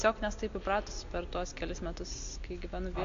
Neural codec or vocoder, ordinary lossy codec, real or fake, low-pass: none; AAC, 48 kbps; real; 7.2 kHz